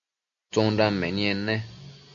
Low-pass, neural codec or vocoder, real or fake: 7.2 kHz; none; real